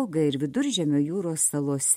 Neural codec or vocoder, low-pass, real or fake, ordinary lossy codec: none; 19.8 kHz; real; MP3, 64 kbps